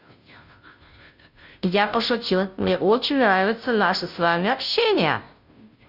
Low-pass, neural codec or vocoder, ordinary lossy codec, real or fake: 5.4 kHz; codec, 16 kHz, 0.5 kbps, FunCodec, trained on Chinese and English, 25 frames a second; none; fake